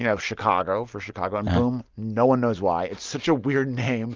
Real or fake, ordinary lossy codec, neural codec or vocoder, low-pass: real; Opus, 32 kbps; none; 7.2 kHz